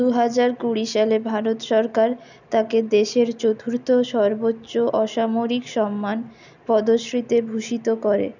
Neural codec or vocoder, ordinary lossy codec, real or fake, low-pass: none; none; real; 7.2 kHz